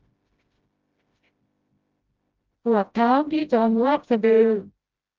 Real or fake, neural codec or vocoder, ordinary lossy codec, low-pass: fake; codec, 16 kHz, 0.5 kbps, FreqCodec, smaller model; Opus, 24 kbps; 7.2 kHz